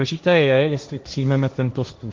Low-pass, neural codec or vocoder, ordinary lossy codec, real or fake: 7.2 kHz; codec, 16 kHz, 1.1 kbps, Voila-Tokenizer; Opus, 24 kbps; fake